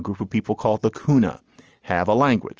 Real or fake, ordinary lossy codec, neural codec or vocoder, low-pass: real; Opus, 24 kbps; none; 7.2 kHz